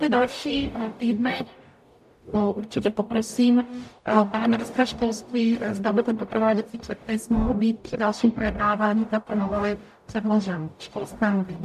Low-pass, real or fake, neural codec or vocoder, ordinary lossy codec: 14.4 kHz; fake; codec, 44.1 kHz, 0.9 kbps, DAC; AAC, 96 kbps